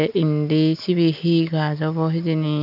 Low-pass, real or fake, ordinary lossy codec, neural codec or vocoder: 5.4 kHz; real; none; none